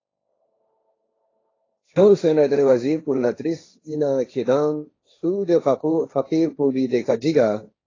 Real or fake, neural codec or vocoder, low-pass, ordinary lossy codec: fake; codec, 16 kHz, 1.1 kbps, Voila-Tokenizer; 7.2 kHz; AAC, 32 kbps